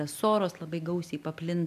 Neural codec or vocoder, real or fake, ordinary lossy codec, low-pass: none; real; MP3, 96 kbps; 14.4 kHz